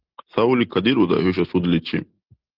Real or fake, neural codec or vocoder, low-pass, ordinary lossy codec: real; none; 5.4 kHz; Opus, 16 kbps